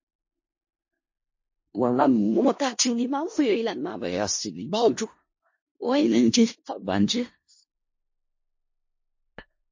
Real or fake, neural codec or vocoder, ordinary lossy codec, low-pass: fake; codec, 16 kHz in and 24 kHz out, 0.4 kbps, LongCat-Audio-Codec, four codebook decoder; MP3, 32 kbps; 7.2 kHz